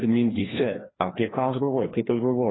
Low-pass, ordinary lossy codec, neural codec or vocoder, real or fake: 7.2 kHz; AAC, 16 kbps; codec, 16 kHz, 1 kbps, FreqCodec, larger model; fake